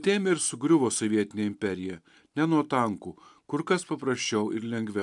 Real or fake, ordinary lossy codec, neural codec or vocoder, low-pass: real; MP3, 96 kbps; none; 10.8 kHz